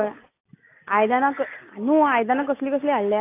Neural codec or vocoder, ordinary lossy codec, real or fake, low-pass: none; AAC, 24 kbps; real; 3.6 kHz